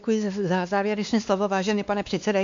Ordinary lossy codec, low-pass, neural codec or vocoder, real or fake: AAC, 64 kbps; 7.2 kHz; codec, 16 kHz, 2 kbps, X-Codec, WavLM features, trained on Multilingual LibriSpeech; fake